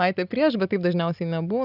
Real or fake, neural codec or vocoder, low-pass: real; none; 5.4 kHz